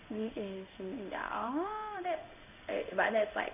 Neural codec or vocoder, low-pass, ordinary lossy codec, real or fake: codec, 16 kHz in and 24 kHz out, 1 kbps, XY-Tokenizer; 3.6 kHz; none; fake